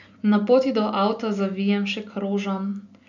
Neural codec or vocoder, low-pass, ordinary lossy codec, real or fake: none; 7.2 kHz; none; real